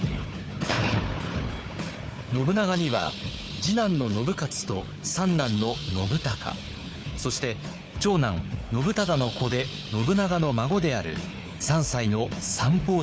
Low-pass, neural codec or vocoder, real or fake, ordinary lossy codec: none; codec, 16 kHz, 4 kbps, FunCodec, trained on Chinese and English, 50 frames a second; fake; none